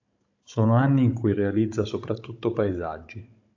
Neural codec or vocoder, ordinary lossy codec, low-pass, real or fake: codec, 16 kHz, 16 kbps, FunCodec, trained on Chinese and English, 50 frames a second; AAC, 48 kbps; 7.2 kHz; fake